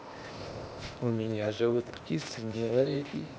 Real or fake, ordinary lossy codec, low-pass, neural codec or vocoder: fake; none; none; codec, 16 kHz, 0.8 kbps, ZipCodec